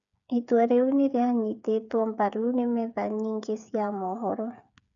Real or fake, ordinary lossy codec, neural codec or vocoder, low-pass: fake; none; codec, 16 kHz, 8 kbps, FreqCodec, smaller model; 7.2 kHz